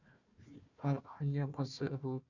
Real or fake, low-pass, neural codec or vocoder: fake; 7.2 kHz; codec, 16 kHz, 1 kbps, FunCodec, trained on Chinese and English, 50 frames a second